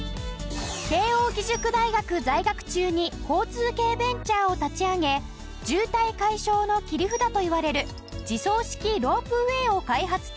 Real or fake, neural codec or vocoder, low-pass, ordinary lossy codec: real; none; none; none